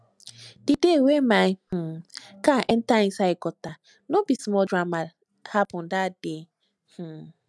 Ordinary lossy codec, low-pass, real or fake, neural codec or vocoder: none; none; real; none